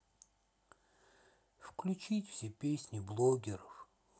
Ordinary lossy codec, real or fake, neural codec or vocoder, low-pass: none; real; none; none